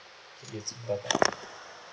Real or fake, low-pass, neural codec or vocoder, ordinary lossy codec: real; none; none; none